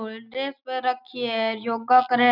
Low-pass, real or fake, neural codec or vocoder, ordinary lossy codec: 5.4 kHz; real; none; none